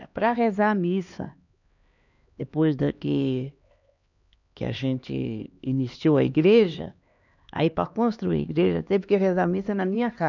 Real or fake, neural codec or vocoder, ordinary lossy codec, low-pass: fake; codec, 16 kHz, 2 kbps, X-Codec, HuBERT features, trained on LibriSpeech; none; 7.2 kHz